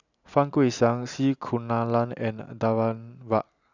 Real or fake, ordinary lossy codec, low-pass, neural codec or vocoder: real; none; 7.2 kHz; none